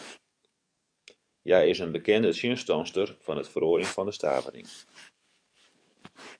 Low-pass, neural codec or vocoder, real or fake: 9.9 kHz; codec, 44.1 kHz, 7.8 kbps, DAC; fake